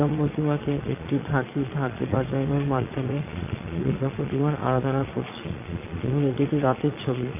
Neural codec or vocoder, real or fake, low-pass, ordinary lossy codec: vocoder, 22.05 kHz, 80 mel bands, Vocos; fake; 3.6 kHz; MP3, 24 kbps